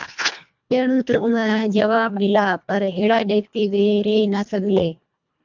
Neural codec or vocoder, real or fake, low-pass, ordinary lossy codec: codec, 24 kHz, 1.5 kbps, HILCodec; fake; 7.2 kHz; MP3, 64 kbps